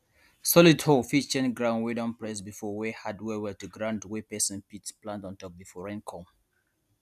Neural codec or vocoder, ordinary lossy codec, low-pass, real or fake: none; none; 14.4 kHz; real